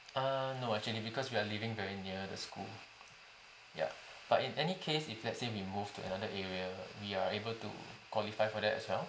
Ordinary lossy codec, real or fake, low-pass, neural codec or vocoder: none; real; none; none